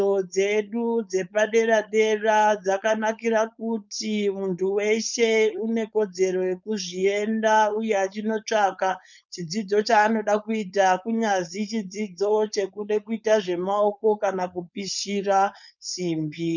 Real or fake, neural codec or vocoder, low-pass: fake; codec, 16 kHz, 4.8 kbps, FACodec; 7.2 kHz